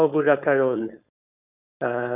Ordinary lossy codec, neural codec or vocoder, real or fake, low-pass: none; codec, 16 kHz, 4.8 kbps, FACodec; fake; 3.6 kHz